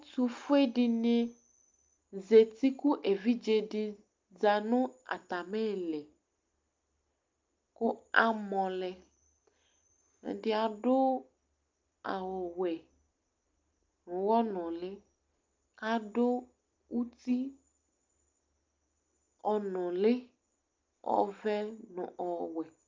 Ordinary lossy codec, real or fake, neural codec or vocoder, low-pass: Opus, 24 kbps; real; none; 7.2 kHz